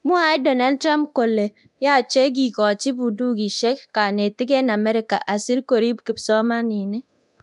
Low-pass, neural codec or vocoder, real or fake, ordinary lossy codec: 10.8 kHz; codec, 24 kHz, 0.9 kbps, DualCodec; fake; none